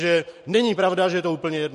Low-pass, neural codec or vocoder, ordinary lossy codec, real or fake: 14.4 kHz; none; MP3, 48 kbps; real